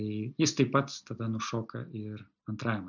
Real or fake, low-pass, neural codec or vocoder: real; 7.2 kHz; none